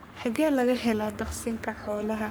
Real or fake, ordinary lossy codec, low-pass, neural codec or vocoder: fake; none; none; codec, 44.1 kHz, 3.4 kbps, Pupu-Codec